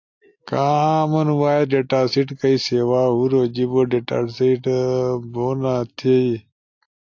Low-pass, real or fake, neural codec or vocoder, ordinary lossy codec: 7.2 kHz; real; none; AAC, 48 kbps